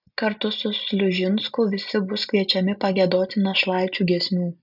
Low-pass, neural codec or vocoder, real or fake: 5.4 kHz; none; real